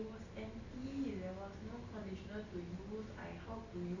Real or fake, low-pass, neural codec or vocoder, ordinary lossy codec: real; 7.2 kHz; none; none